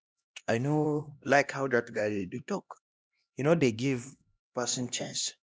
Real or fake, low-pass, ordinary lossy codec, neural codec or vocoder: fake; none; none; codec, 16 kHz, 1 kbps, X-Codec, HuBERT features, trained on LibriSpeech